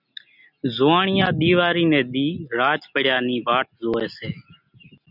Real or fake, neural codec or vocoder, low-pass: real; none; 5.4 kHz